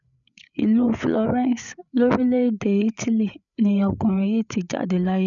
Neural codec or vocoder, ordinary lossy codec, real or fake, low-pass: codec, 16 kHz, 8 kbps, FreqCodec, larger model; none; fake; 7.2 kHz